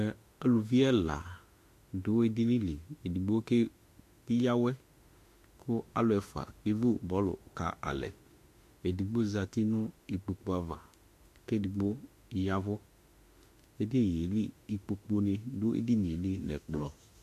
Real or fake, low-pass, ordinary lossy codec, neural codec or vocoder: fake; 14.4 kHz; AAC, 64 kbps; autoencoder, 48 kHz, 32 numbers a frame, DAC-VAE, trained on Japanese speech